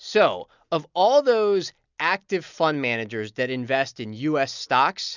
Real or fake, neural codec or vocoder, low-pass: real; none; 7.2 kHz